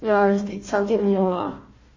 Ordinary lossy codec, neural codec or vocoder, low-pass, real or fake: MP3, 32 kbps; codec, 16 kHz, 1 kbps, FunCodec, trained on Chinese and English, 50 frames a second; 7.2 kHz; fake